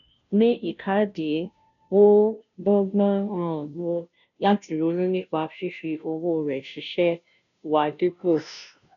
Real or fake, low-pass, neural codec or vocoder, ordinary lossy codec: fake; 7.2 kHz; codec, 16 kHz, 0.5 kbps, FunCodec, trained on Chinese and English, 25 frames a second; none